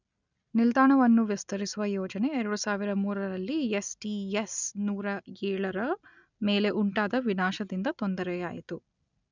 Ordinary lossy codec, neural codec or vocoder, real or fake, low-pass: none; none; real; 7.2 kHz